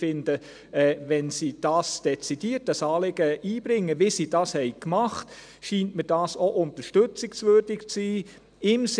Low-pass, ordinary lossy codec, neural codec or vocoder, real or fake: 9.9 kHz; none; none; real